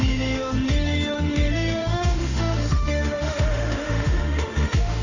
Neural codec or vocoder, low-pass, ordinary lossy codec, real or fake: autoencoder, 48 kHz, 32 numbers a frame, DAC-VAE, trained on Japanese speech; 7.2 kHz; none; fake